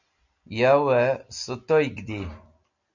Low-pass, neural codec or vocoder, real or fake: 7.2 kHz; none; real